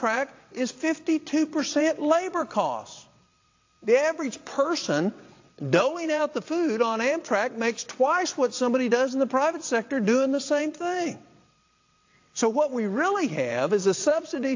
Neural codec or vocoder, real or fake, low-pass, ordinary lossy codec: vocoder, 22.05 kHz, 80 mel bands, WaveNeXt; fake; 7.2 kHz; AAC, 48 kbps